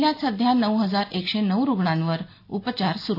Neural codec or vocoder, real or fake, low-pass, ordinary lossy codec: none; real; 5.4 kHz; AAC, 32 kbps